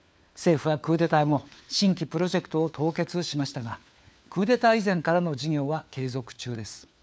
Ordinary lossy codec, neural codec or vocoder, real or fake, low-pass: none; codec, 16 kHz, 4 kbps, FunCodec, trained on LibriTTS, 50 frames a second; fake; none